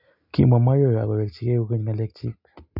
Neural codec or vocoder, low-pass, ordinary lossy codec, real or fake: none; 5.4 kHz; none; real